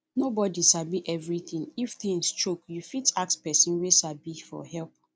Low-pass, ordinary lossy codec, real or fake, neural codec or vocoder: none; none; real; none